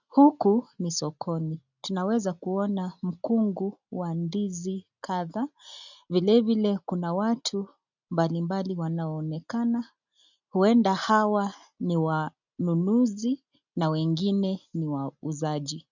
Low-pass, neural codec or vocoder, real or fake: 7.2 kHz; none; real